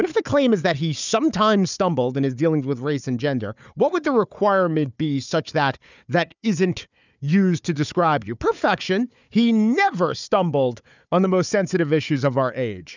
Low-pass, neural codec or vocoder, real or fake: 7.2 kHz; codec, 16 kHz, 4 kbps, FunCodec, trained on Chinese and English, 50 frames a second; fake